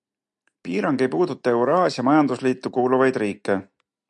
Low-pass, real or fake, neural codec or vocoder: 10.8 kHz; real; none